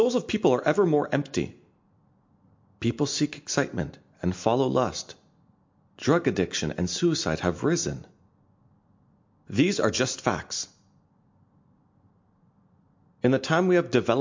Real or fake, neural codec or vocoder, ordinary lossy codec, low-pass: real; none; MP3, 48 kbps; 7.2 kHz